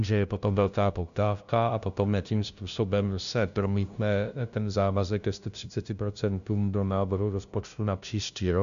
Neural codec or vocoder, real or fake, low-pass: codec, 16 kHz, 0.5 kbps, FunCodec, trained on LibriTTS, 25 frames a second; fake; 7.2 kHz